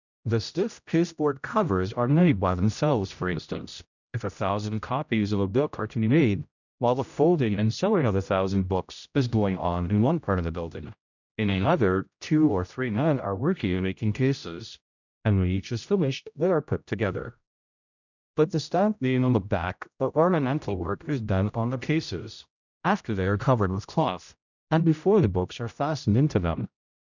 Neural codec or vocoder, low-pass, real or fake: codec, 16 kHz, 0.5 kbps, X-Codec, HuBERT features, trained on general audio; 7.2 kHz; fake